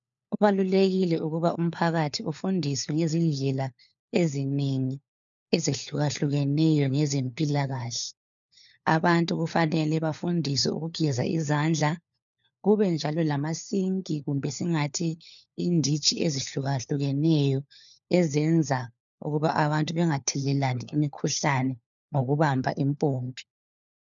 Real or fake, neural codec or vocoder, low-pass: fake; codec, 16 kHz, 4 kbps, FunCodec, trained on LibriTTS, 50 frames a second; 7.2 kHz